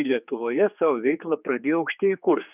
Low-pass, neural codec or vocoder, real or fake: 3.6 kHz; codec, 16 kHz, 4 kbps, X-Codec, HuBERT features, trained on general audio; fake